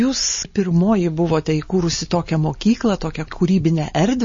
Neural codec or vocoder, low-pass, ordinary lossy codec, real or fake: none; 7.2 kHz; MP3, 32 kbps; real